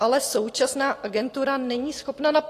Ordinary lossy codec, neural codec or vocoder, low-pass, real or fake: AAC, 48 kbps; vocoder, 44.1 kHz, 128 mel bands every 256 samples, BigVGAN v2; 14.4 kHz; fake